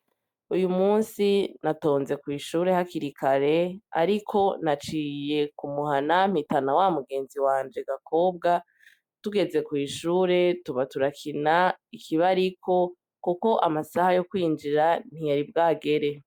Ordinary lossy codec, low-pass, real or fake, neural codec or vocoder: MP3, 96 kbps; 19.8 kHz; real; none